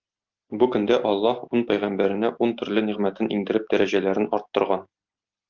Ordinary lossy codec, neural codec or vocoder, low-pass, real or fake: Opus, 24 kbps; none; 7.2 kHz; real